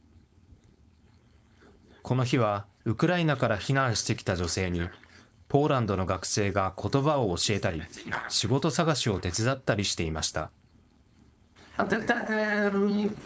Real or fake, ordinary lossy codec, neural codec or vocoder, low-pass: fake; none; codec, 16 kHz, 4.8 kbps, FACodec; none